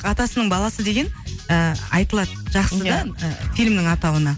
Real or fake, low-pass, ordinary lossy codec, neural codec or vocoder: real; none; none; none